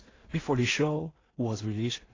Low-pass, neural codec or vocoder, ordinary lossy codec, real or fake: 7.2 kHz; codec, 16 kHz in and 24 kHz out, 0.4 kbps, LongCat-Audio-Codec, two codebook decoder; AAC, 32 kbps; fake